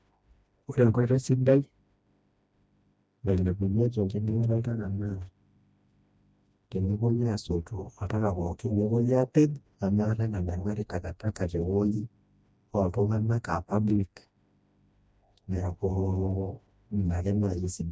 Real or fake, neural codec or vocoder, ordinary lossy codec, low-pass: fake; codec, 16 kHz, 1 kbps, FreqCodec, smaller model; none; none